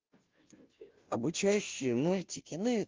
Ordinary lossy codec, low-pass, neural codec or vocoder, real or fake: Opus, 32 kbps; 7.2 kHz; codec, 16 kHz, 0.5 kbps, FunCodec, trained on Chinese and English, 25 frames a second; fake